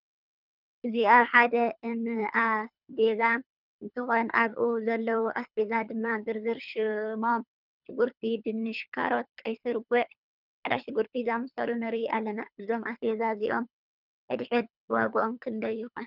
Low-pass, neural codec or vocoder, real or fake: 5.4 kHz; codec, 24 kHz, 3 kbps, HILCodec; fake